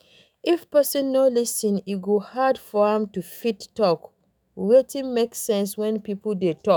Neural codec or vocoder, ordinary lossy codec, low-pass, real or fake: autoencoder, 48 kHz, 128 numbers a frame, DAC-VAE, trained on Japanese speech; none; none; fake